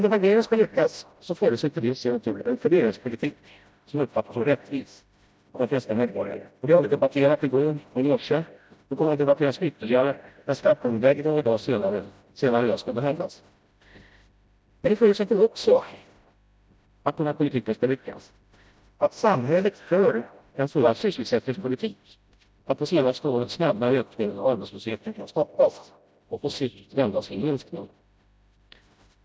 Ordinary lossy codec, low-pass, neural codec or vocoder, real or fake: none; none; codec, 16 kHz, 0.5 kbps, FreqCodec, smaller model; fake